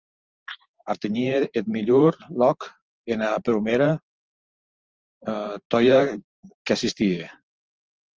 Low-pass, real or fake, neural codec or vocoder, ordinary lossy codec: 7.2 kHz; fake; vocoder, 44.1 kHz, 128 mel bands every 512 samples, BigVGAN v2; Opus, 24 kbps